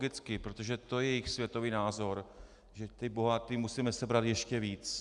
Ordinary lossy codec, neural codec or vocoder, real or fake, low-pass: Opus, 64 kbps; none; real; 10.8 kHz